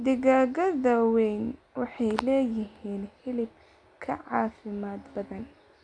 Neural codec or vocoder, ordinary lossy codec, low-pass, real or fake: none; none; 9.9 kHz; real